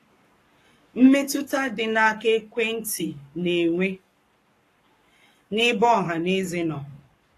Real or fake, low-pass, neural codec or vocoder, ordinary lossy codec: fake; 14.4 kHz; codec, 44.1 kHz, 7.8 kbps, Pupu-Codec; AAC, 48 kbps